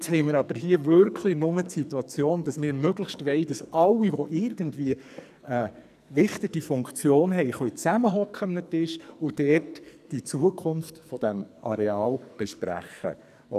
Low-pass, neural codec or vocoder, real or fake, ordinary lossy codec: 14.4 kHz; codec, 44.1 kHz, 2.6 kbps, SNAC; fake; none